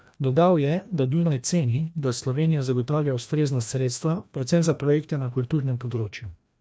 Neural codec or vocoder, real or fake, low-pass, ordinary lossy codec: codec, 16 kHz, 1 kbps, FreqCodec, larger model; fake; none; none